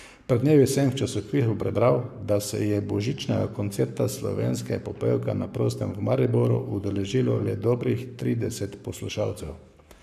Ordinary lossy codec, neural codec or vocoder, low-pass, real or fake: none; codec, 44.1 kHz, 7.8 kbps, Pupu-Codec; 14.4 kHz; fake